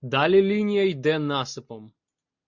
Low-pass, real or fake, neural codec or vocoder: 7.2 kHz; real; none